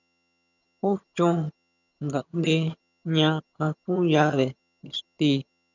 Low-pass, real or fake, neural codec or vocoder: 7.2 kHz; fake; vocoder, 22.05 kHz, 80 mel bands, HiFi-GAN